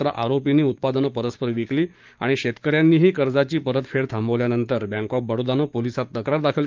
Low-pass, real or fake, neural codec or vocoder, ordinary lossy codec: 7.2 kHz; fake; codec, 16 kHz, 6 kbps, DAC; Opus, 24 kbps